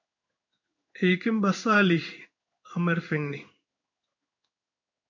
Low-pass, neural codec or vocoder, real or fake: 7.2 kHz; codec, 16 kHz in and 24 kHz out, 1 kbps, XY-Tokenizer; fake